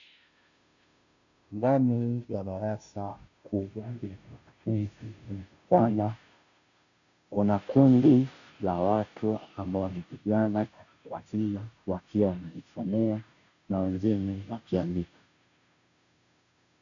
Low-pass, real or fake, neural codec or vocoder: 7.2 kHz; fake; codec, 16 kHz, 0.5 kbps, FunCodec, trained on Chinese and English, 25 frames a second